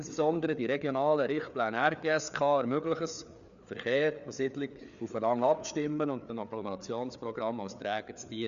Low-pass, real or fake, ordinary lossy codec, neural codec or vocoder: 7.2 kHz; fake; none; codec, 16 kHz, 4 kbps, FreqCodec, larger model